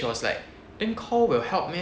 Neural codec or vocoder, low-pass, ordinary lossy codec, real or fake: none; none; none; real